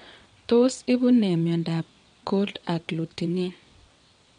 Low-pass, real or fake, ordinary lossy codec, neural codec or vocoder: 9.9 kHz; fake; MP3, 64 kbps; vocoder, 22.05 kHz, 80 mel bands, WaveNeXt